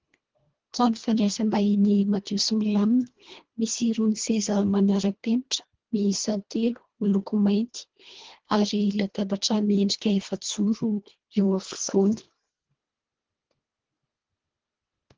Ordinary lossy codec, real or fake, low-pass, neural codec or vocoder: Opus, 16 kbps; fake; 7.2 kHz; codec, 24 kHz, 1.5 kbps, HILCodec